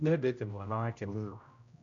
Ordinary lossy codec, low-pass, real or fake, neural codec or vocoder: none; 7.2 kHz; fake; codec, 16 kHz, 0.5 kbps, X-Codec, HuBERT features, trained on general audio